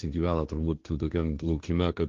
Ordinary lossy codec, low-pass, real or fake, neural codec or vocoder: Opus, 24 kbps; 7.2 kHz; fake; codec, 16 kHz, 1.1 kbps, Voila-Tokenizer